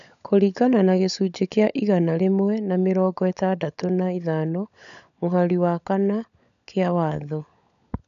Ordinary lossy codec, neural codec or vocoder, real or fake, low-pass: none; codec, 16 kHz, 16 kbps, FunCodec, trained on LibriTTS, 50 frames a second; fake; 7.2 kHz